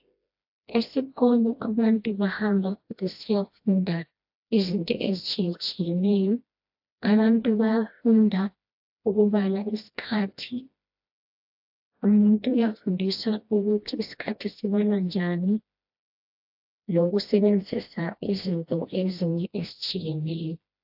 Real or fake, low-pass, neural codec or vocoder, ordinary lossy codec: fake; 5.4 kHz; codec, 16 kHz, 1 kbps, FreqCodec, smaller model; AAC, 48 kbps